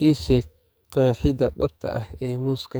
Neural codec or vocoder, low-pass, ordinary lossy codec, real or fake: codec, 44.1 kHz, 2.6 kbps, SNAC; none; none; fake